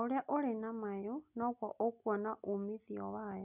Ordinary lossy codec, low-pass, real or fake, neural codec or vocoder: none; 3.6 kHz; real; none